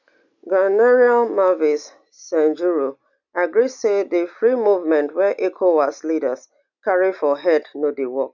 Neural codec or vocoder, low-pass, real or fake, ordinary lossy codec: none; 7.2 kHz; real; none